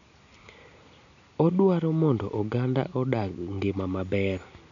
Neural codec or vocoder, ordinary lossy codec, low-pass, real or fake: none; none; 7.2 kHz; real